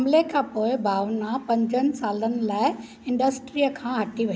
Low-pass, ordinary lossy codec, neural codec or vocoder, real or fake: none; none; none; real